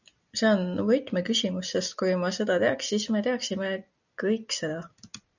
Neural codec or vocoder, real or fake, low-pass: none; real; 7.2 kHz